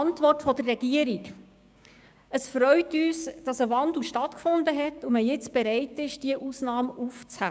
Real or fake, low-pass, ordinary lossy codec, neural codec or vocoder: fake; none; none; codec, 16 kHz, 6 kbps, DAC